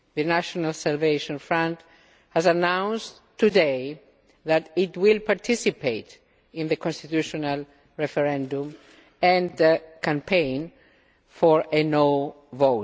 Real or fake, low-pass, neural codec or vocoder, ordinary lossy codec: real; none; none; none